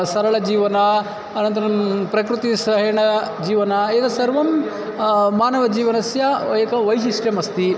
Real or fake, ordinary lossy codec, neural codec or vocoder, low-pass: real; none; none; none